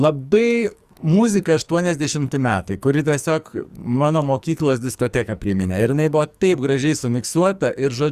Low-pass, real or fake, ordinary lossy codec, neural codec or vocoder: 14.4 kHz; fake; Opus, 64 kbps; codec, 44.1 kHz, 2.6 kbps, SNAC